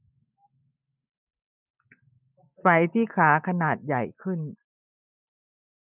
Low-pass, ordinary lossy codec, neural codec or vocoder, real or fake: 3.6 kHz; none; none; real